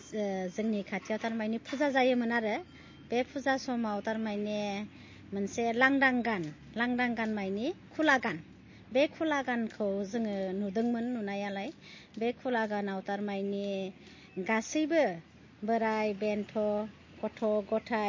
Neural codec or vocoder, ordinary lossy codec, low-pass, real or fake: none; MP3, 32 kbps; 7.2 kHz; real